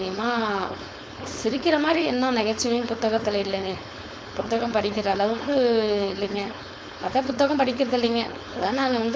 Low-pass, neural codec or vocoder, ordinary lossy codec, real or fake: none; codec, 16 kHz, 4.8 kbps, FACodec; none; fake